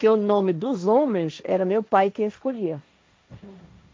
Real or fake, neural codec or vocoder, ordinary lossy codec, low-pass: fake; codec, 16 kHz, 1.1 kbps, Voila-Tokenizer; none; none